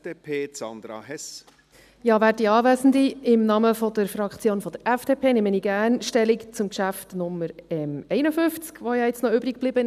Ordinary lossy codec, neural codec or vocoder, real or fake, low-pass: none; none; real; 14.4 kHz